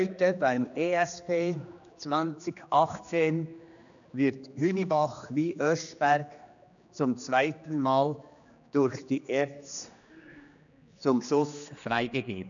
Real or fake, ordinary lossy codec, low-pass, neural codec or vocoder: fake; none; 7.2 kHz; codec, 16 kHz, 2 kbps, X-Codec, HuBERT features, trained on general audio